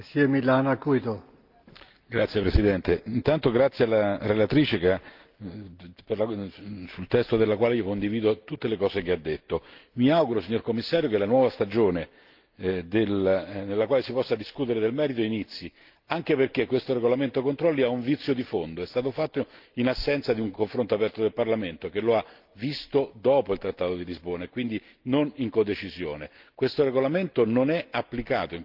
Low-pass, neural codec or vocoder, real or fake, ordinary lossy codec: 5.4 kHz; none; real; Opus, 32 kbps